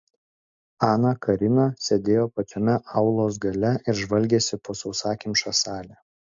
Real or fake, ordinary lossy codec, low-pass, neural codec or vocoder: real; MP3, 48 kbps; 7.2 kHz; none